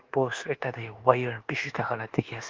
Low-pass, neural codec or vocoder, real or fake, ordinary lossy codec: 7.2 kHz; codec, 24 kHz, 1.2 kbps, DualCodec; fake; Opus, 16 kbps